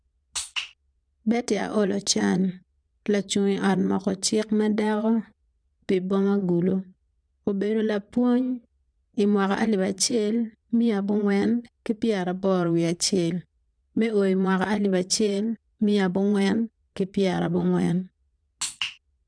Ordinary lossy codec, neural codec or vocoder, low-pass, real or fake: none; vocoder, 22.05 kHz, 80 mel bands, Vocos; 9.9 kHz; fake